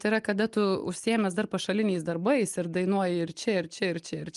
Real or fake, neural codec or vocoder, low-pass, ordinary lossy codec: real; none; 10.8 kHz; Opus, 32 kbps